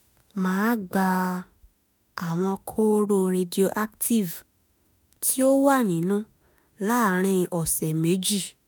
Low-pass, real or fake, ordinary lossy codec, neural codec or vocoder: none; fake; none; autoencoder, 48 kHz, 32 numbers a frame, DAC-VAE, trained on Japanese speech